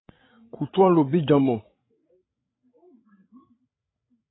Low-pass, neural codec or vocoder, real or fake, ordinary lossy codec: 7.2 kHz; codec, 16 kHz, 16 kbps, FreqCodec, larger model; fake; AAC, 16 kbps